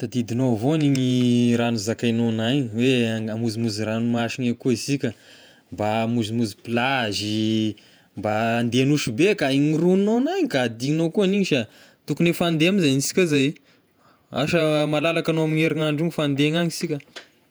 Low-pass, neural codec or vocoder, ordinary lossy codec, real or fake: none; vocoder, 48 kHz, 128 mel bands, Vocos; none; fake